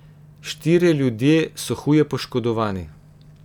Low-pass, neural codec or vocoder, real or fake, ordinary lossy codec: 19.8 kHz; none; real; none